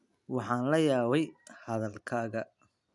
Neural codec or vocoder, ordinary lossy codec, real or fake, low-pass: none; none; real; 10.8 kHz